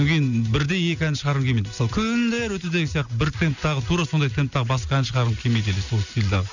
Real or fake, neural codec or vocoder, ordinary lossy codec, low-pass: real; none; none; 7.2 kHz